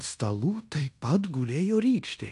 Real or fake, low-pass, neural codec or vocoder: fake; 10.8 kHz; codec, 16 kHz in and 24 kHz out, 0.9 kbps, LongCat-Audio-Codec, fine tuned four codebook decoder